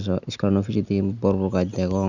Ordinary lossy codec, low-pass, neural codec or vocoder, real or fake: none; 7.2 kHz; none; real